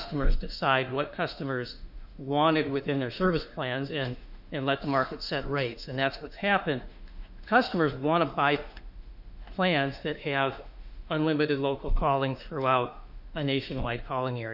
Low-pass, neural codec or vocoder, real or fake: 5.4 kHz; autoencoder, 48 kHz, 32 numbers a frame, DAC-VAE, trained on Japanese speech; fake